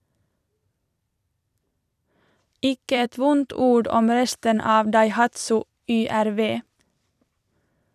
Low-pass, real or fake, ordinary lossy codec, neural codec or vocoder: 14.4 kHz; fake; none; vocoder, 44.1 kHz, 128 mel bands every 256 samples, BigVGAN v2